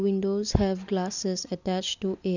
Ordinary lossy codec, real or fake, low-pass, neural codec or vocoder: none; real; 7.2 kHz; none